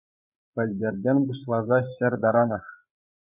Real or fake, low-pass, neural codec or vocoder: fake; 3.6 kHz; codec, 16 kHz, 16 kbps, FreqCodec, larger model